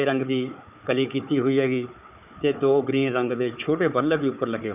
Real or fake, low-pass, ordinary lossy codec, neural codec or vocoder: fake; 3.6 kHz; none; codec, 16 kHz, 4 kbps, FunCodec, trained on Chinese and English, 50 frames a second